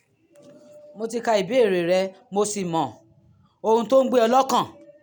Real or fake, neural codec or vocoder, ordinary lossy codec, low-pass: real; none; none; none